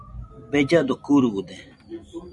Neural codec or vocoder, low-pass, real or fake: vocoder, 44.1 kHz, 128 mel bands every 256 samples, BigVGAN v2; 10.8 kHz; fake